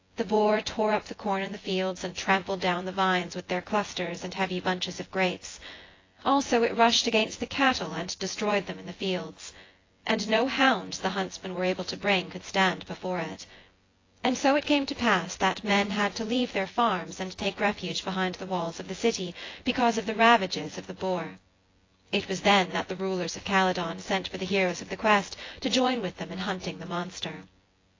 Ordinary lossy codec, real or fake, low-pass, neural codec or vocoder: AAC, 32 kbps; fake; 7.2 kHz; vocoder, 24 kHz, 100 mel bands, Vocos